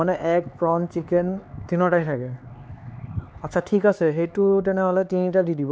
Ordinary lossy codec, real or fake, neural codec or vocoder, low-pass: none; fake; codec, 16 kHz, 4 kbps, X-Codec, HuBERT features, trained on LibriSpeech; none